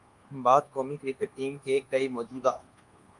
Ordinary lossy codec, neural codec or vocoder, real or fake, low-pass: Opus, 32 kbps; codec, 24 kHz, 1.2 kbps, DualCodec; fake; 10.8 kHz